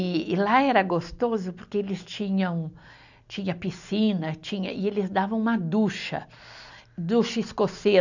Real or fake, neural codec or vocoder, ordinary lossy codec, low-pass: real; none; none; 7.2 kHz